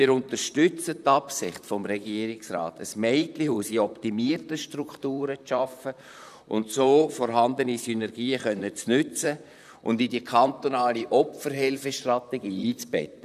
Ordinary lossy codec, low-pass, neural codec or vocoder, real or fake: none; 14.4 kHz; vocoder, 44.1 kHz, 128 mel bands, Pupu-Vocoder; fake